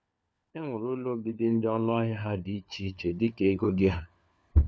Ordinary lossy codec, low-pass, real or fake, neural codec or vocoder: none; none; fake; codec, 16 kHz, 4 kbps, FunCodec, trained on LibriTTS, 50 frames a second